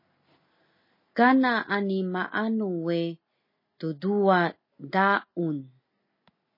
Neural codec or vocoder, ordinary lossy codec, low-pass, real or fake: none; MP3, 24 kbps; 5.4 kHz; real